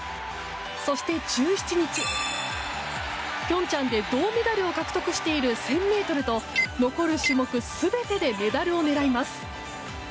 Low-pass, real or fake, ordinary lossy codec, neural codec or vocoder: none; real; none; none